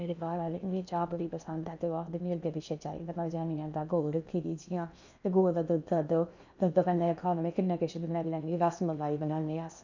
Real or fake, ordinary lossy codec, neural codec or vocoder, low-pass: fake; none; codec, 16 kHz in and 24 kHz out, 0.8 kbps, FocalCodec, streaming, 65536 codes; 7.2 kHz